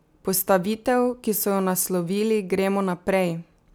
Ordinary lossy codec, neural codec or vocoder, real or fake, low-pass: none; none; real; none